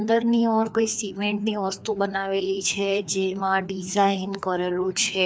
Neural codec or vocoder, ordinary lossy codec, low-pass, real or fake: codec, 16 kHz, 2 kbps, FreqCodec, larger model; none; none; fake